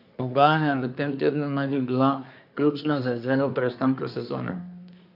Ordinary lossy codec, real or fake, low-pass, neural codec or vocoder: none; fake; 5.4 kHz; codec, 24 kHz, 1 kbps, SNAC